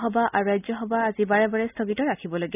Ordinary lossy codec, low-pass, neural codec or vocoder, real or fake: none; 3.6 kHz; none; real